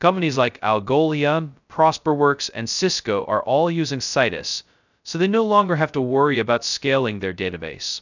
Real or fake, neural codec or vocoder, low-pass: fake; codec, 16 kHz, 0.2 kbps, FocalCodec; 7.2 kHz